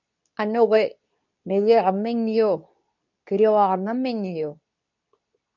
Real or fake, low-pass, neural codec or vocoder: fake; 7.2 kHz; codec, 24 kHz, 0.9 kbps, WavTokenizer, medium speech release version 2